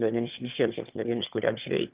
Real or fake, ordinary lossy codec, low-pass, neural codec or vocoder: fake; Opus, 32 kbps; 3.6 kHz; autoencoder, 22.05 kHz, a latent of 192 numbers a frame, VITS, trained on one speaker